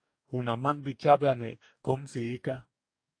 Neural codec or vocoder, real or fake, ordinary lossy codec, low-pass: codec, 44.1 kHz, 2.6 kbps, DAC; fake; AAC, 48 kbps; 9.9 kHz